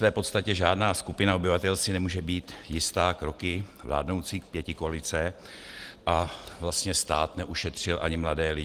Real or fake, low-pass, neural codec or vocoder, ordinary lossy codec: real; 14.4 kHz; none; Opus, 24 kbps